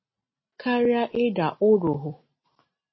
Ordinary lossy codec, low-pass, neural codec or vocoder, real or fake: MP3, 24 kbps; 7.2 kHz; none; real